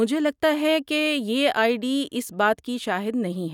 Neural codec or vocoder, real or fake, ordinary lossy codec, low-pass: none; real; none; 19.8 kHz